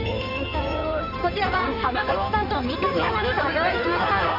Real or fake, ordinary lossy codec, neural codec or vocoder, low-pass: fake; none; codec, 16 kHz in and 24 kHz out, 2.2 kbps, FireRedTTS-2 codec; 5.4 kHz